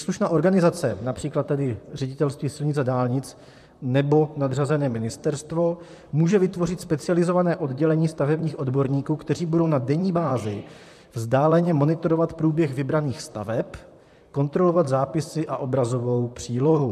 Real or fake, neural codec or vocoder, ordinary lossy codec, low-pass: fake; vocoder, 44.1 kHz, 128 mel bands, Pupu-Vocoder; MP3, 96 kbps; 14.4 kHz